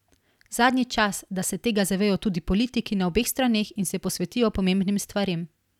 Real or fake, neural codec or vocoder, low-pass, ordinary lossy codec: real; none; 19.8 kHz; none